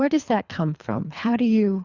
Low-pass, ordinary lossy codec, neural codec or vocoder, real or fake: 7.2 kHz; Opus, 64 kbps; codec, 24 kHz, 3 kbps, HILCodec; fake